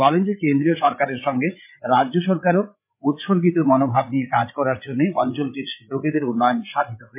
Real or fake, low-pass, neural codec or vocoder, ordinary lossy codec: fake; 3.6 kHz; codec, 16 kHz, 8 kbps, FreqCodec, larger model; MP3, 32 kbps